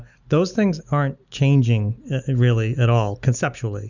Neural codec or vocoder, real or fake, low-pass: none; real; 7.2 kHz